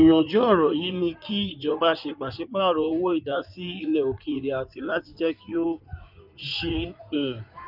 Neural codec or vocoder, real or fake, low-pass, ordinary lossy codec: codec, 16 kHz in and 24 kHz out, 2.2 kbps, FireRedTTS-2 codec; fake; 5.4 kHz; none